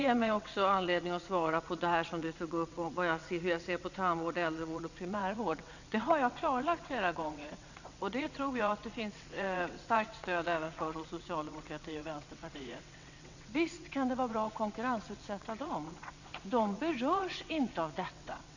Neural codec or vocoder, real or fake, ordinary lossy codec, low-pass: vocoder, 22.05 kHz, 80 mel bands, WaveNeXt; fake; none; 7.2 kHz